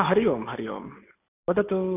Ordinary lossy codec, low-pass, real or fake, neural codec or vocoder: none; 3.6 kHz; real; none